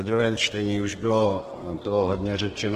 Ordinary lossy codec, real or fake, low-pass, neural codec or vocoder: Opus, 16 kbps; fake; 14.4 kHz; codec, 32 kHz, 1.9 kbps, SNAC